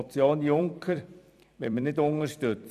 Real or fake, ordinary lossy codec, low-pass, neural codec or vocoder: fake; none; 14.4 kHz; vocoder, 44.1 kHz, 128 mel bands every 256 samples, BigVGAN v2